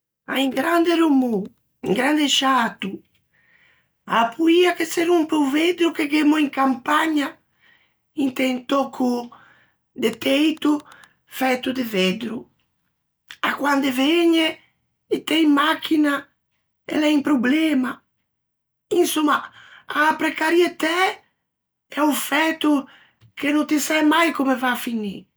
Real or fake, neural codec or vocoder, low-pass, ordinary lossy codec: real; none; none; none